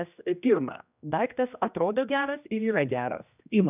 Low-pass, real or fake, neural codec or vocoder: 3.6 kHz; fake; codec, 16 kHz, 1 kbps, X-Codec, HuBERT features, trained on general audio